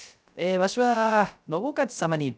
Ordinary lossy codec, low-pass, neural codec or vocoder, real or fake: none; none; codec, 16 kHz, 0.3 kbps, FocalCodec; fake